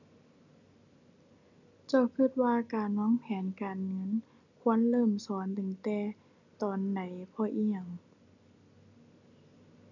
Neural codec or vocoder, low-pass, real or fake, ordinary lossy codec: none; 7.2 kHz; real; none